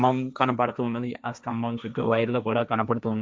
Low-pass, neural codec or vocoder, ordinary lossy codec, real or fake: none; codec, 16 kHz, 1.1 kbps, Voila-Tokenizer; none; fake